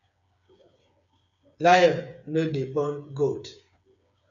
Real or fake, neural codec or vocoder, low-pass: fake; codec, 16 kHz, 8 kbps, FreqCodec, smaller model; 7.2 kHz